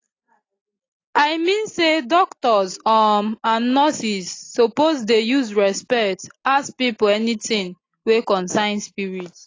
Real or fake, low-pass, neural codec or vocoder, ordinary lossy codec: real; 7.2 kHz; none; AAC, 32 kbps